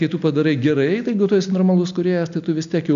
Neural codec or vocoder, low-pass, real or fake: none; 7.2 kHz; real